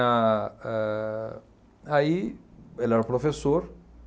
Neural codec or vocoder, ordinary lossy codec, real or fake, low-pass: none; none; real; none